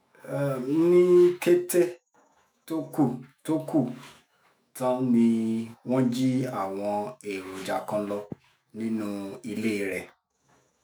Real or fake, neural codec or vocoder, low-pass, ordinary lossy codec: fake; autoencoder, 48 kHz, 128 numbers a frame, DAC-VAE, trained on Japanese speech; none; none